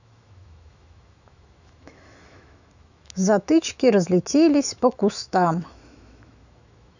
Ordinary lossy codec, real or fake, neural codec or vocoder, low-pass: none; fake; autoencoder, 48 kHz, 128 numbers a frame, DAC-VAE, trained on Japanese speech; 7.2 kHz